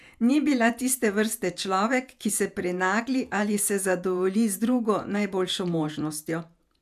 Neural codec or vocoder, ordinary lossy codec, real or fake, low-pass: vocoder, 48 kHz, 128 mel bands, Vocos; none; fake; 14.4 kHz